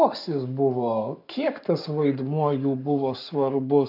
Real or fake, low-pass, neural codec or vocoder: fake; 5.4 kHz; codec, 44.1 kHz, 7.8 kbps, Pupu-Codec